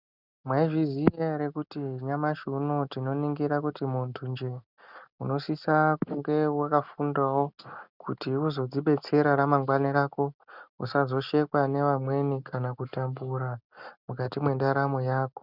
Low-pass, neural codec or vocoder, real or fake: 5.4 kHz; none; real